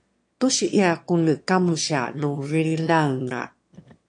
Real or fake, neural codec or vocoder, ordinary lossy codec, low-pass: fake; autoencoder, 22.05 kHz, a latent of 192 numbers a frame, VITS, trained on one speaker; MP3, 48 kbps; 9.9 kHz